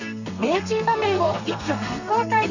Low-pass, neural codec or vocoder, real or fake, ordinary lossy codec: 7.2 kHz; codec, 32 kHz, 1.9 kbps, SNAC; fake; none